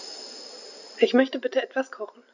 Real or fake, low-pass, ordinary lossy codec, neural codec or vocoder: real; 7.2 kHz; none; none